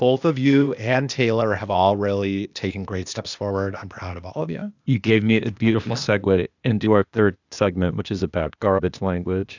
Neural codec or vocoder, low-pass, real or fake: codec, 16 kHz, 0.8 kbps, ZipCodec; 7.2 kHz; fake